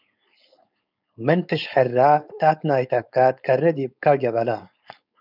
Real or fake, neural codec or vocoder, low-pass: fake; codec, 16 kHz, 4.8 kbps, FACodec; 5.4 kHz